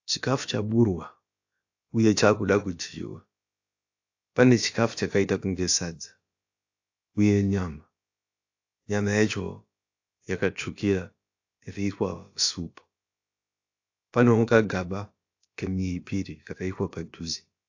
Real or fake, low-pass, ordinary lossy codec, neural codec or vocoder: fake; 7.2 kHz; AAC, 48 kbps; codec, 16 kHz, about 1 kbps, DyCAST, with the encoder's durations